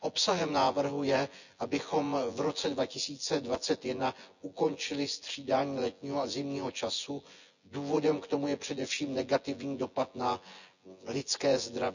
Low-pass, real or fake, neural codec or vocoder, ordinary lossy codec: 7.2 kHz; fake; vocoder, 24 kHz, 100 mel bands, Vocos; none